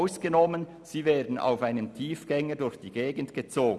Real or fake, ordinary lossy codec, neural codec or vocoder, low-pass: real; none; none; none